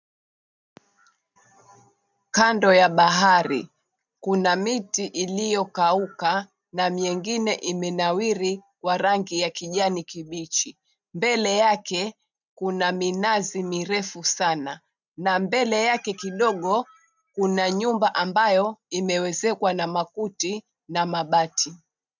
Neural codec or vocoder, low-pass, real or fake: none; 7.2 kHz; real